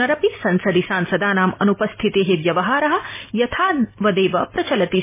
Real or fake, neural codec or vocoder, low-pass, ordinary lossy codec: real; none; 3.6 kHz; MP3, 16 kbps